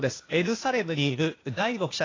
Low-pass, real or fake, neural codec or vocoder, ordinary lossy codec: 7.2 kHz; fake; codec, 16 kHz, 0.8 kbps, ZipCodec; AAC, 32 kbps